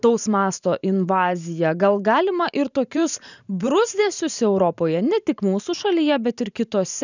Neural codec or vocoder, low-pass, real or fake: vocoder, 44.1 kHz, 128 mel bands every 512 samples, BigVGAN v2; 7.2 kHz; fake